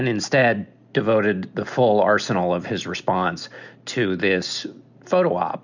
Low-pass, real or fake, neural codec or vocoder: 7.2 kHz; real; none